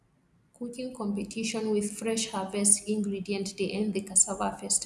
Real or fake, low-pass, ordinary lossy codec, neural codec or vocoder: real; none; none; none